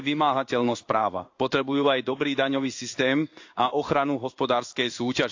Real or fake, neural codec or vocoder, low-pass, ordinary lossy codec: fake; codec, 16 kHz in and 24 kHz out, 1 kbps, XY-Tokenizer; 7.2 kHz; AAC, 48 kbps